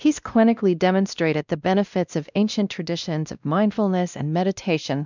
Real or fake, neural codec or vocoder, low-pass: fake; codec, 16 kHz, 1 kbps, X-Codec, WavLM features, trained on Multilingual LibriSpeech; 7.2 kHz